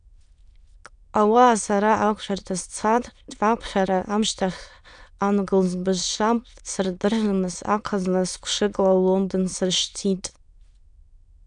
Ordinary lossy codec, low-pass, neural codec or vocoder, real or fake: MP3, 96 kbps; 9.9 kHz; autoencoder, 22.05 kHz, a latent of 192 numbers a frame, VITS, trained on many speakers; fake